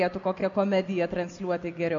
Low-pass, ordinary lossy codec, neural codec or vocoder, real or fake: 7.2 kHz; MP3, 64 kbps; none; real